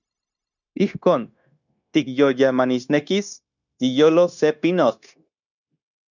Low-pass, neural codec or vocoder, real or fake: 7.2 kHz; codec, 16 kHz, 0.9 kbps, LongCat-Audio-Codec; fake